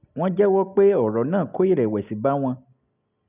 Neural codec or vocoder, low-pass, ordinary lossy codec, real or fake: none; 3.6 kHz; none; real